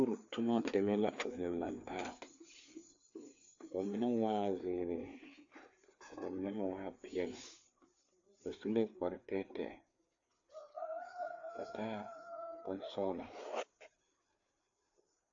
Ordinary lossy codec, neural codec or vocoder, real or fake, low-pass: AAC, 64 kbps; codec, 16 kHz, 4 kbps, FreqCodec, larger model; fake; 7.2 kHz